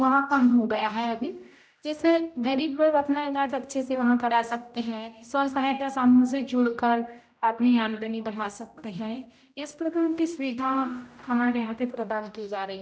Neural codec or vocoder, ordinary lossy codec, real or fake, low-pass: codec, 16 kHz, 0.5 kbps, X-Codec, HuBERT features, trained on general audio; none; fake; none